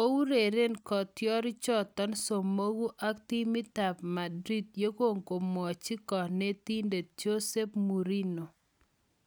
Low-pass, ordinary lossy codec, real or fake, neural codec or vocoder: none; none; real; none